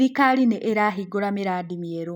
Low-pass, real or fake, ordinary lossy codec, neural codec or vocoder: 19.8 kHz; real; none; none